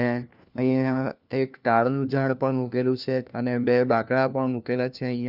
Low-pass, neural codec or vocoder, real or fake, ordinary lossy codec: 5.4 kHz; codec, 16 kHz, 1 kbps, FunCodec, trained on LibriTTS, 50 frames a second; fake; none